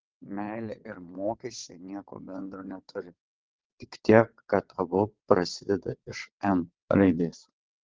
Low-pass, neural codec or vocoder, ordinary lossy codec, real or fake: 7.2 kHz; vocoder, 22.05 kHz, 80 mel bands, WaveNeXt; Opus, 16 kbps; fake